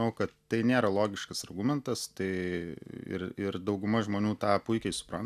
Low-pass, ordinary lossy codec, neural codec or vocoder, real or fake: 14.4 kHz; AAC, 96 kbps; none; real